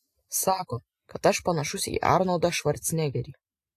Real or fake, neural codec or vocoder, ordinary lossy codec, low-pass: real; none; AAC, 64 kbps; 14.4 kHz